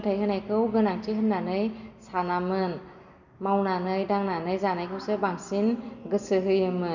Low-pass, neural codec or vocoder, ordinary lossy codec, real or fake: 7.2 kHz; none; none; real